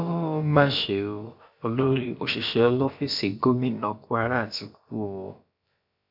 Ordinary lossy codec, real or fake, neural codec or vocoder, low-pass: none; fake; codec, 16 kHz, about 1 kbps, DyCAST, with the encoder's durations; 5.4 kHz